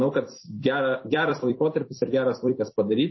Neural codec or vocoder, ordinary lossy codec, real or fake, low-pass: vocoder, 44.1 kHz, 128 mel bands every 256 samples, BigVGAN v2; MP3, 24 kbps; fake; 7.2 kHz